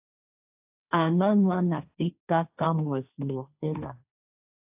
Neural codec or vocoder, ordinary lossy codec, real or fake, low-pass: codec, 16 kHz, 1.1 kbps, Voila-Tokenizer; AAC, 32 kbps; fake; 3.6 kHz